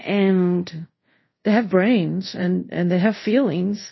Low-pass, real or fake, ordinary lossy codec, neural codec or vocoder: 7.2 kHz; fake; MP3, 24 kbps; codec, 24 kHz, 0.5 kbps, DualCodec